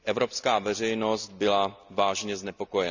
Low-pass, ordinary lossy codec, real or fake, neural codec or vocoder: 7.2 kHz; none; real; none